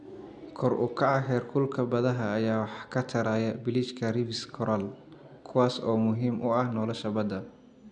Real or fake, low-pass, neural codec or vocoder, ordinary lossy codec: real; 9.9 kHz; none; none